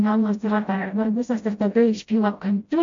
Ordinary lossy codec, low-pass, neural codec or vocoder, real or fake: MP3, 48 kbps; 7.2 kHz; codec, 16 kHz, 0.5 kbps, FreqCodec, smaller model; fake